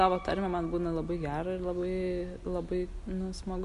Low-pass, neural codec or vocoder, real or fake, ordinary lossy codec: 14.4 kHz; none; real; MP3, 48 kbps